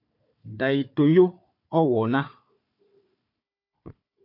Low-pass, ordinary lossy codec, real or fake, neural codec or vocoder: 5.4 kHz; MP3, 48 kbps; fake; codec, 16 kHz, 4 kbps, FunCodec, trained on Chinese and English, 50 frames a second